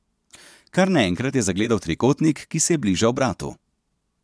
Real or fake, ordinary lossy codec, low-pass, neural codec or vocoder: fake; none; none; vocoder, 22.05 kHz, 80 mel bands, Vocos